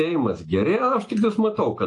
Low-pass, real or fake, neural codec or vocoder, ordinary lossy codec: 10.8 kHz; real; none; AAC, 48 kbps